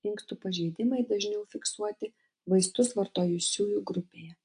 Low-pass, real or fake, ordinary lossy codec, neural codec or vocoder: 9.9 kHz; real; AAC, 64 kbps; none